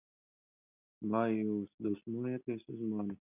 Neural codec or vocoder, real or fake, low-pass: none; real; 3.6 kHz